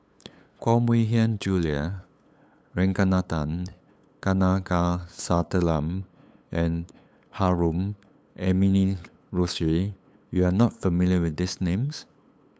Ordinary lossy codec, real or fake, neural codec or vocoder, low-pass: none; fake; codec, 16 kHz, 8 kbps, FunCodec, trained on LibriTTS, 25 frames a second; none